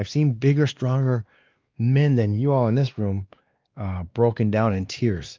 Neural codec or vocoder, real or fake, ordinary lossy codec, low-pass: codec, 16 kHz, 2 kbps, X-Codec, WavLM features, trained on Multilingual LibriSpeech; fake; Opus, 24 kbps; 7.2 kHz